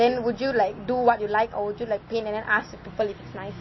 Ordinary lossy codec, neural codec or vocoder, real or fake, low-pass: MP3, 24 kbps; none; real; 7.2 kHz